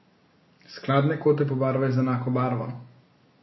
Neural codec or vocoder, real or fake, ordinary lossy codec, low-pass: none; real; MP3, 24 kbps; 7.2 kHz